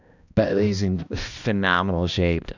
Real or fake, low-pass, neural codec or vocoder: fake; 7.2 kHz; codec, 16 kHz, 1 kbps, X-Codec, HuBERT features, trained on balanced general audio